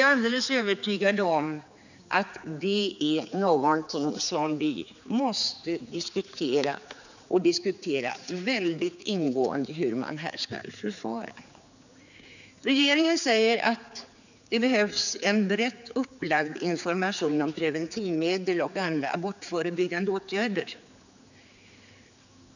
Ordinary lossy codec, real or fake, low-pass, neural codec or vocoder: none; fake; 7.2 kHz; codec, 16 kHz, 4 kbps, X-Codec, HuBERT features, trained on general audio